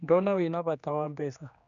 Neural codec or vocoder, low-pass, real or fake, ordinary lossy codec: codec, 16 kHz, 2 kbps, X-Codec, HuBERT features, trained on general audio; 7.2 kHz; fake; none